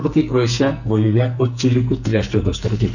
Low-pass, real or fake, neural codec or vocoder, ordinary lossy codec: 7.2 kHz; fake; codec, 44.1 kHz, 2.6 kbps, SNAC; none